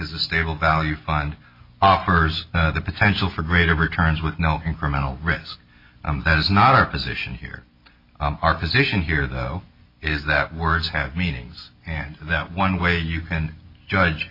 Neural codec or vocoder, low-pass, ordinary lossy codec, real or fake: none; 5.4 kHz; MP3, 32 kbps; real